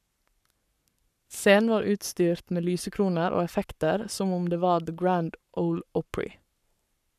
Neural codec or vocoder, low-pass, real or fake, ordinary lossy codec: codec, 44.1 kHz, 7.8 kbps, Pupu-Codec; 14.4 kHz; fake; none